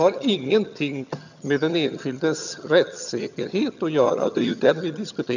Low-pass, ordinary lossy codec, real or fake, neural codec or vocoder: 7.2 kHz; none; fake; vocoder, 22.05 kHz, 80 mel bands, HiFi-GAN